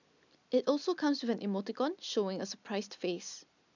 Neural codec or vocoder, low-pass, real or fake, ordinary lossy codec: none; 7.2 kHz; real; none